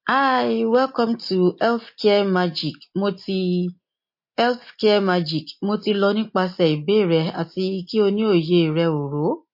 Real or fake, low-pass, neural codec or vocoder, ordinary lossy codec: real; 5.4 kHz; none; MP3, 32 kbps